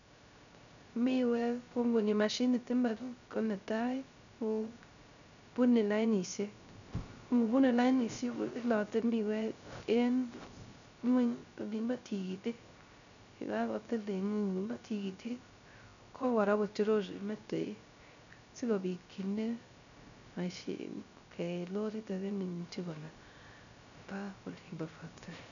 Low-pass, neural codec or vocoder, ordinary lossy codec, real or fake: 7.2 kHz; codec, 16 kHz, 0.3 kbps, FocalCodec; none; fake